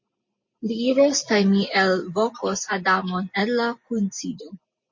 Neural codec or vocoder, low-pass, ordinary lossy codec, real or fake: none; 7.2 kHz; MP3, 32 kbps; real